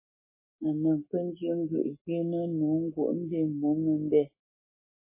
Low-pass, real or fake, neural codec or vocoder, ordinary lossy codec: 3.6 kHz; fake; codec, 44.1 kHz, 7.8 kbps, Pupu-Codec; MP3, 16 kbps